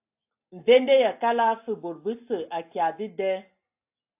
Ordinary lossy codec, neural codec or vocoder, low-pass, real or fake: AAC, 32 kbps; none; 3.6 kHz; real